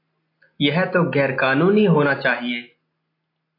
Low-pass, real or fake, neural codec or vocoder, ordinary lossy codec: 5.4 kHz; real; none; AAC, 24 kbps